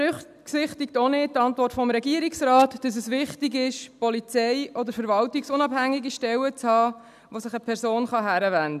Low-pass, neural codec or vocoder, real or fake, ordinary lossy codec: 14.4 kHz; none; real; none